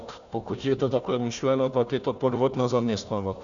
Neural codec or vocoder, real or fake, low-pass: codec, 16 kHz, 1 kbps, FunCodec, trained on Chinese and English, 50 frames a second; fake; 7.2 kHz